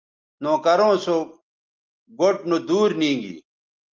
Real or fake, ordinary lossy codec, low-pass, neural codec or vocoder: real; Opus, 24 kbps; 7.2 kHz; none